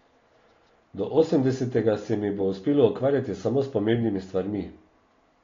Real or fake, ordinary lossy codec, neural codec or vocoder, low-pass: real; AAC, 32 kbps; none; 7.2 kHz